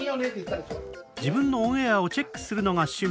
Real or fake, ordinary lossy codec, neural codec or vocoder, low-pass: real; none; none; none